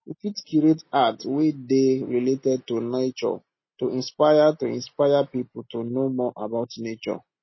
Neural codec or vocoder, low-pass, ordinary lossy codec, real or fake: none; 7.2 kHz; MP3, 24 kbps; real